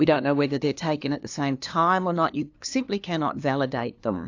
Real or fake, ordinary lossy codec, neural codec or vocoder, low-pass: fake; AAC, 48 kbps; codec, 16 kHz, 2 kbps, FunCodec, trained on LibriTTS, 25 frames a second; 7.2 kHz